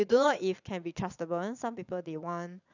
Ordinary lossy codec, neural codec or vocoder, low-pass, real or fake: none; vocoder, 22.05 kHz, 80 mel bands, Vocos; 7.2 kHz; fake